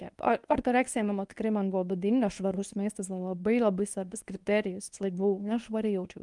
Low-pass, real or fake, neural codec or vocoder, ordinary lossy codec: 10.8 kHz; fake; codec, 24 kHz, 0.9 kbps, WavTokenizer, medium speech release version 1; Opus, 32 kbps